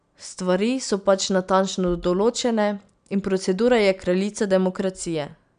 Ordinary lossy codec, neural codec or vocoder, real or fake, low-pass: none; none; real; 9.9 kHz